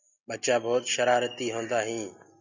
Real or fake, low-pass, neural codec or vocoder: real; 7.2 kHz; none